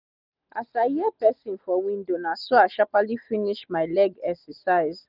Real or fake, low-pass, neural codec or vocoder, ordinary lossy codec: real; 5.4 kHz; none; none